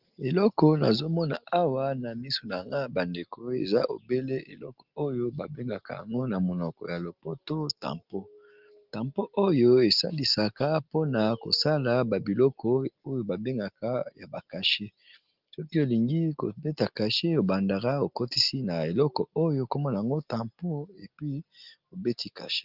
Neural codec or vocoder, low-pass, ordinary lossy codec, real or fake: none; 5.4 kHz; Opus, 32 kbps; real